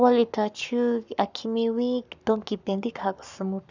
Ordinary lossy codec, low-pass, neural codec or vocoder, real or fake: none; 7.2 kHz; codec, 44.1 kHz, 7.8 kbps, Pupu-Codec; fake